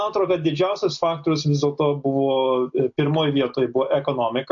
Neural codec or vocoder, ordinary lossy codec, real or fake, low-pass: none; MP3, 48 kbps; real; 7.2 kHz